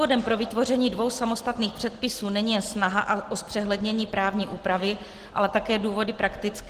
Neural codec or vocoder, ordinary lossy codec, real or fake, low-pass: none; Opus, 16 kbps; real; 14.4 kHz